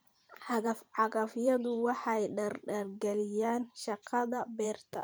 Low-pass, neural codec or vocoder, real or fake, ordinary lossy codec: none; vocoder, 44.1 kHz, 128 mel bands every 512 samples, BigVGAN v2; fake; none